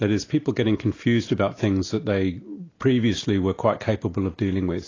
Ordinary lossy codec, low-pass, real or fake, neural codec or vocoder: AAC, 32 kbps; 7.2 kHz; real; none